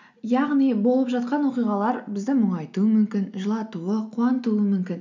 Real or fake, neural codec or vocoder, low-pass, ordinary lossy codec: real; none; 7.2 kHz; none